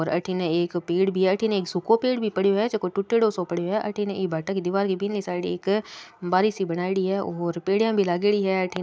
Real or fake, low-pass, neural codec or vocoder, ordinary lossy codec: real; none; none; none